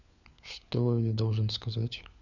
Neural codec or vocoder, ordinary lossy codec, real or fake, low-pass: codec, 16 kHz, 4 kbps, FunCodec, trained on LibriTTS, 50 frames a second; none; fake; 7.2 kHz